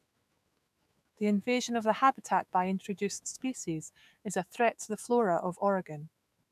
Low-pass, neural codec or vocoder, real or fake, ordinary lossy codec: 14.4 kHz; autoencoder, 48 kHz, 128 numbers a frame, DAC-VAE, trained on Japanese speech; fake; none